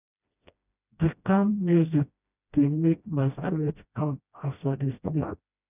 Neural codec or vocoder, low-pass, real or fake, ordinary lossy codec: codec, 16 kHz, 1 kbps, FreqCodec, smaller model; 3.6 kHz; fake; none